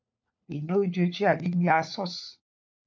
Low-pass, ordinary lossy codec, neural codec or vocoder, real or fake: 7.2 kHz; MP3, 48 kbps; codec, 16 kHz, 4 kbps, FunCodec, trained on LibriTTS, 50 frames a second; fake